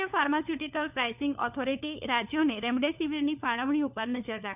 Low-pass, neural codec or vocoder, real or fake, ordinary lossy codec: 3.6 kHz; codec, 16 kHz, 4 kbps, FunCodec, trained on LibriTTS, 50 frames a second; fake; none